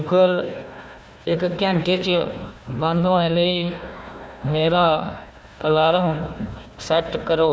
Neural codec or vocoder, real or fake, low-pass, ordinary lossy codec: codec, 16 kHz, 1 kbps, FunCodec, trained on Chinese and English, 50 frames a second; fake; none; none